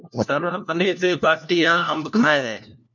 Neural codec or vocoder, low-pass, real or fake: codec, 16 kHz, 1 kbps, FunCodec, trained on LibriTTS, 50 frames a second; 7.2 kHz; fake